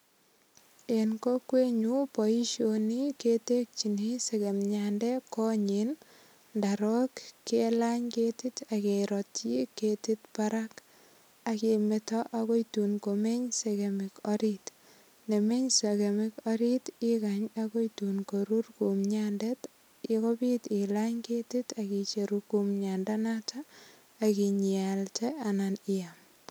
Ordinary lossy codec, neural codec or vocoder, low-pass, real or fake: none; none; none; real